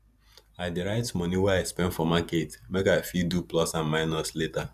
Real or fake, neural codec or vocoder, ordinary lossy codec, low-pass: fake; vocoder, 44.1 kHz, 128 mel bands every 512 samples, BigVGAN v2; none; 14.4 kHz